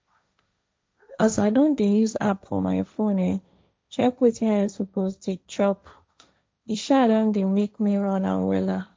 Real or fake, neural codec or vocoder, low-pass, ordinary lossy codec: fake; codec, 16 kHz, 1.1 kbps, Voila-Tokenizer; none; none